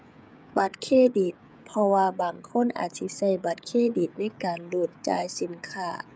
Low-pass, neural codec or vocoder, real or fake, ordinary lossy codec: none; codec, 16 kHz, 16 kbps, FreqCodec, smaller model; fake; none